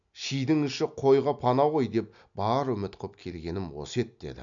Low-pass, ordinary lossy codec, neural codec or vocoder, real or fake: 7.2 kHz; none; none; real